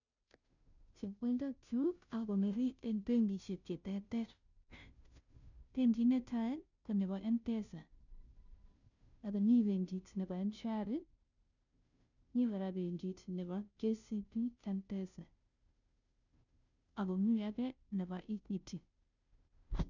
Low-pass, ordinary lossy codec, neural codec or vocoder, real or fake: 7.2 kHz; none; codec, 16 kHz, 0.5 kbps, FunCodec, trained on Chinese and English, 25 frames a second; fake